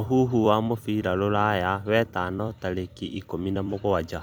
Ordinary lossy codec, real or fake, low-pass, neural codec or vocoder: none; fake; none; vocoder, 44.1 kHz, 128 mel bands every 256 samples, BigVGAN v2